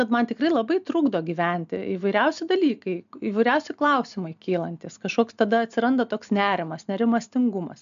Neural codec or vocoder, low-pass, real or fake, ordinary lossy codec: none; 7.2 kHz; real; AAC, 96 kbps